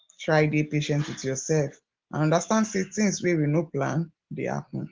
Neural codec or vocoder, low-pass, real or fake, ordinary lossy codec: none; 7.2 kHz; real; Opus, 16 kbps